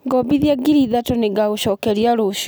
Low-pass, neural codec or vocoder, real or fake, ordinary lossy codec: none; none; real; none